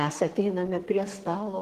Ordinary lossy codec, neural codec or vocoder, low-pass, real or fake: Opus, 16 kbps; codec, 44.1 kHz, 2.6 kbps, SNAC; 14.4 kHz; fake